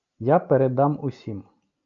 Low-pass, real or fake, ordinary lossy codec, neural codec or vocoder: 7.2 kHz; real; MP3, 64 kbps; none